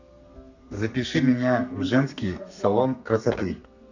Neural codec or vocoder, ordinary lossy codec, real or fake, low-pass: codec, 32 kHz, 1.9 kbps, SNAC; none; fake; 7.2 kHz